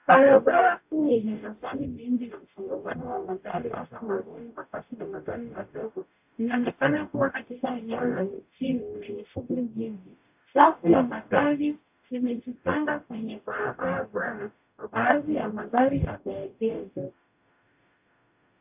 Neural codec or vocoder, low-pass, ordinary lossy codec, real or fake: codec, 44.1 kHz, 0.9 kbps, DAC; 3.6 kHz; AAC, 32 kbps; fake